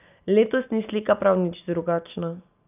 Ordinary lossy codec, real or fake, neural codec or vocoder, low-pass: none; real; none; 3.6 kHz